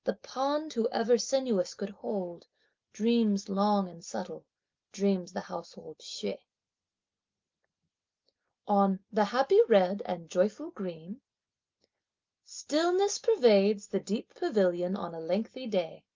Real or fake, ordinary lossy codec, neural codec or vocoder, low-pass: real; Opus, 32 kbps; none; 7.2 kHz